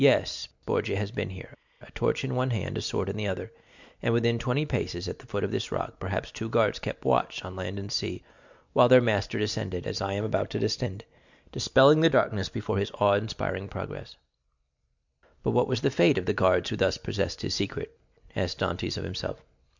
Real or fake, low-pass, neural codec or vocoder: real; 7.2 kHz; none